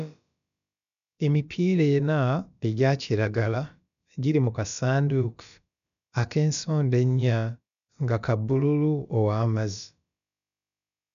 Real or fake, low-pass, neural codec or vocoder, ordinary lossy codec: fake; 7.2 kHz; codec, 16 kHz, about 1 kbps, DyCAST, with the encoder's durations; none